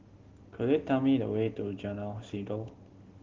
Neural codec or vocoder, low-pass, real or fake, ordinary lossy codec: none; 7.2 kHz; real; Opus, 16 kbps